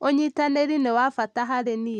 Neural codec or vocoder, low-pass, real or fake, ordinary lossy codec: none; none; real; none